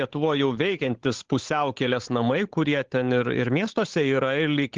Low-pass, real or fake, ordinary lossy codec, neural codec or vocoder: 7.2 kHz; fake; Opus, 16 kbps; codec, 16 kHz, 8 kbps, FunCodec, trained on Chinese and English, 25 frames a second